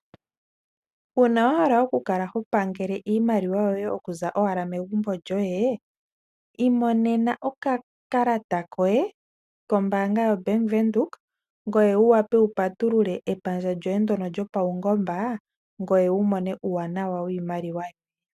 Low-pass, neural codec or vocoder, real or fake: 14.4 kHz; none; real